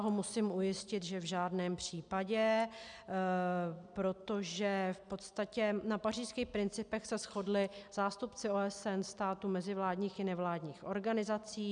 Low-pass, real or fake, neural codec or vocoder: 9.9 kHz; real; none